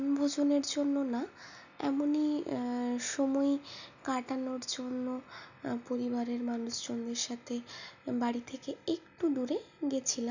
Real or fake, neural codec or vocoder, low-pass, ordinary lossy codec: real; none; 7.2 kHz; none